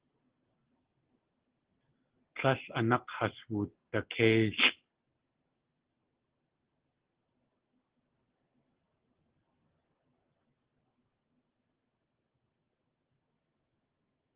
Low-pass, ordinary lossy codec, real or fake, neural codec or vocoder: 3.6 kHz; Opus, 16 kbps; real; none